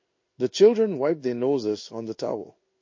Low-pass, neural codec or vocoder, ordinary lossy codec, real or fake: 7.2 kHz; codec, 16 kHz in and 24 kHz out, 1 kbps, XY-Tokenizer; MP3, 32 kbps; fake